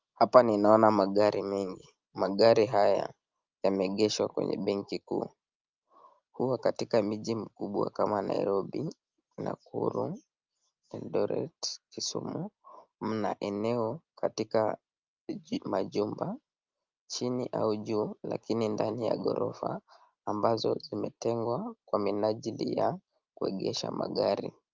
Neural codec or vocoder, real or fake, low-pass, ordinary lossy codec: none; real; 7.2 kHz; Opus, 24 kbps